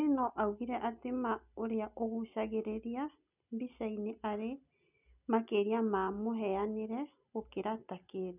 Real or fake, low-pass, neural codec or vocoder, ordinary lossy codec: real; 3.6 kHz; none; none